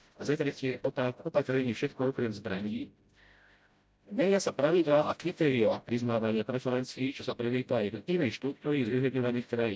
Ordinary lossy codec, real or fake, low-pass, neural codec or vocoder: none; fake; none; codec, 16 kHz, 0.5 kbps, FreqCodec, smaller model